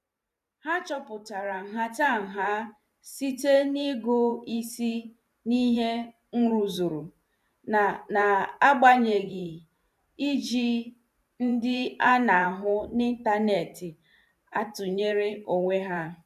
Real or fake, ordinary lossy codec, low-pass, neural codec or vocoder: fake; none; 14.4 kHz; vocoder, 44.1 kHz, 128 mel bands every 512 samples, BigVGAN v2